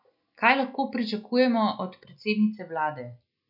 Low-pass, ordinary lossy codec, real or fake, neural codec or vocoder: 5.4 kHz; none; real; none